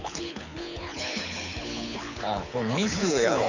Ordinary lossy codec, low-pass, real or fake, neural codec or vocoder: none; 7.2 kHz; fake; codec, 24 kHz, 6 kbps, HILCodec